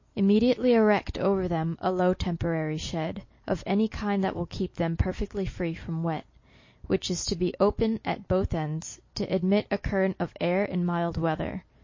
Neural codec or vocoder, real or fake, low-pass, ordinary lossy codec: none; real; 7.2 kHz; MP3, 32 kbps